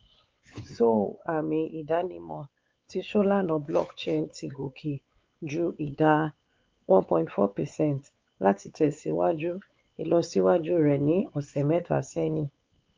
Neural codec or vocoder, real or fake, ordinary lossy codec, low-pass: codec, 16 kHz, 4 kbps, X-Codec, WavLM features, trained on Multilingual LibriSpeech; fake; Opus, 24 kbps; 7.2 kHz